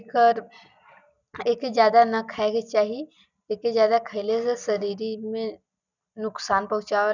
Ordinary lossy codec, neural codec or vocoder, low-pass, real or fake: none; none; 7.2 kHz; real